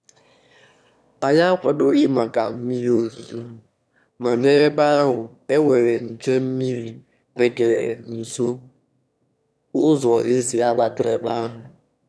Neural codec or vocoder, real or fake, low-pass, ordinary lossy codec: autoencoder, 22.05 kHz, a latent of 192 numbers a frame, VITS, trained on one speaker; fake; none; none